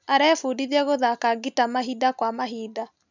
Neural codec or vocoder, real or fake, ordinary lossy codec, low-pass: none; real; none; 7.2 kHz